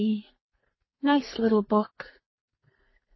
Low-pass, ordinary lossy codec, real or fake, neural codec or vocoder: 7.2 kHz; MP3, 24 kbps; fake; codec, 44.1 kHz, 2.6 kbps, SNAC